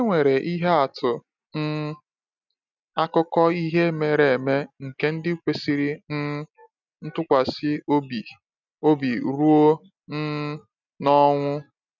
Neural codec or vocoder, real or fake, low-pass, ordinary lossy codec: none; real; 7.2 kHz; none